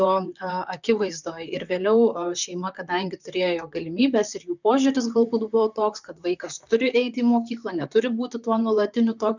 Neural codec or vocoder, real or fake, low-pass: vocoder, 44.1 kHz, 128 mel bands, Pupu-Vocoder; fake; 7.2 kHz